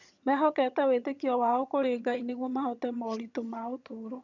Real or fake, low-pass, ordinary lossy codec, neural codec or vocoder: fake; 7.2 kHz; none; vocoder, 22.05 kHz, 80 mel bands, HiFi-GAN